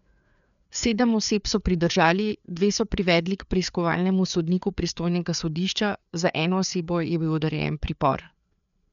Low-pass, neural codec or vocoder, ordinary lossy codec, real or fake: 7.2 kHz; codec, 16 kHz, 4 kbps, FreqCodec, larger model; none; fake